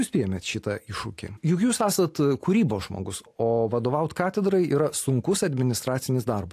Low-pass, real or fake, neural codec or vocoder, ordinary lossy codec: 14.4 kHz; real; none; AAC, 64 kbps